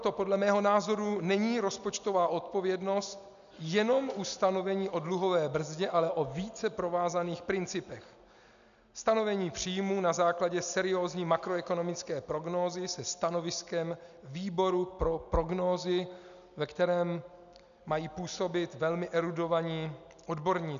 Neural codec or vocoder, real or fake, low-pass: none; real; 7.2 kHz